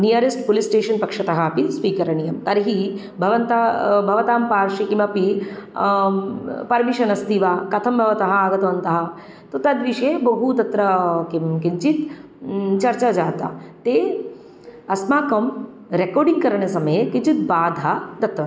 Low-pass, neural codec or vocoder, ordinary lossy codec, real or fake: none; none; none; real